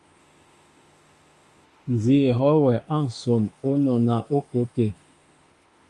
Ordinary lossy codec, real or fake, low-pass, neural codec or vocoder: Opus, 32 kbps; fake; 10.8 kHz; autoencoder, 48 kHz, 32 numbers a frame, DAC-VAE, trained on Japanese speech